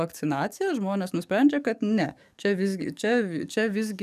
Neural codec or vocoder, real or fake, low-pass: codec, 44.1 kHz, 7.8 kbps, DAC; fake; 14.4 kHz